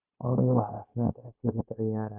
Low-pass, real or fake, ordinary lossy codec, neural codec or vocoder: 3.6 kHz; fake; none; codec, 16 kHz, 0.9 kbps, LongCat-Audio-Codec